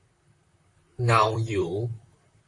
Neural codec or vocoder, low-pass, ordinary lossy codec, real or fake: vocoder, 44.1 kHz, 128 mel bands, Pupu-Vocoder; 10.8 kHz; AAC, 48 kbps; fake